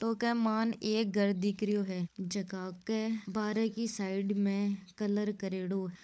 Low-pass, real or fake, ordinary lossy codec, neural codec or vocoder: none; fake; none; codec, 16 kHz, 16 kbps, FunCodec, trained on LibriTTS, 50 frames a second